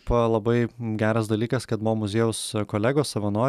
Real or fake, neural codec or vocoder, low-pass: real; none; 14.4 kHz